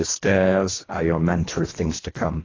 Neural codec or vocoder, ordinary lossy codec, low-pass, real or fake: codec, 24 kHz, 1.5 kbps, HILCodec; AAC, 32 kbps; 7.2 kHz; fake